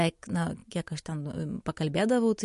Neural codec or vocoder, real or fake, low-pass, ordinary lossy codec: none; real; 14.4 kHz; MP3, 48 kbps